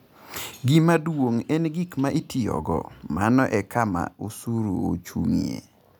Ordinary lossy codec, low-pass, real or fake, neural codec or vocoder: none; none; real; none